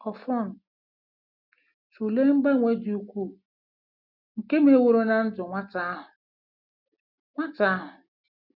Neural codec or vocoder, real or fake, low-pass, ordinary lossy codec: none; real; 5.4 kHz; none